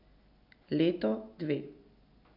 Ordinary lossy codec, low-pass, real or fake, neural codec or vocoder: none; 5.4 kHz; real; none